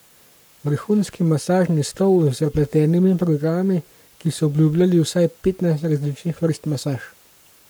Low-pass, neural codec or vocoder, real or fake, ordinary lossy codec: none; codec, 44.1 kHz, 7.8 kbps, Pupu-Codec; fake; none